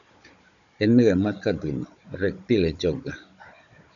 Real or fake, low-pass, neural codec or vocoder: fake; 7.2 kHz; codec, 16 kHz, 16 kbps, FunCodec, trained on Chinese and English, 50 frames a second